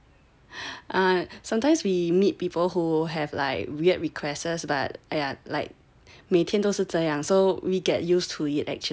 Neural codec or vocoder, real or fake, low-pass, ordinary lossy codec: none; real; none; none